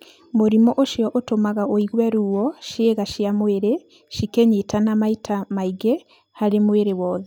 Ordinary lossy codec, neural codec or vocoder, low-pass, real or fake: none; none; 19.8 kHz; real